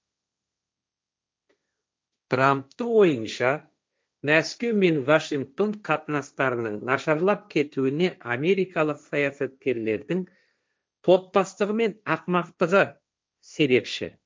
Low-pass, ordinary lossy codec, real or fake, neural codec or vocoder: none; none; fake; codec, 16 kHz, 1.1 kbps, Voila-Tokenizer